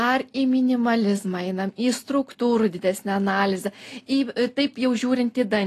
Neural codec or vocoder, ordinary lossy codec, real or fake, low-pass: none; AAC, 48 kbps; real; 14.4 kHz